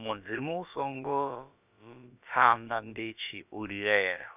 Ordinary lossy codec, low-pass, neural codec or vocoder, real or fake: none; 3.6 kHz; codec, 16 kHz, about 1 kbps, DyCAST, with the encoder's durations; fake